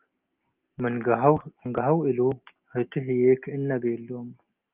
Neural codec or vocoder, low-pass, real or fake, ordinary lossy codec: none; 3.6 kHz; real; Opus, 24 kbps